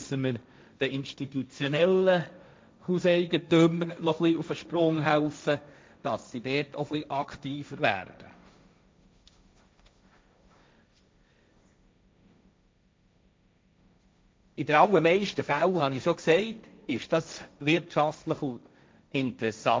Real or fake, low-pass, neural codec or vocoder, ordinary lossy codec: fake; none; codec, 16 kHz, 1.1 kbps, Voila-Tokenizer; none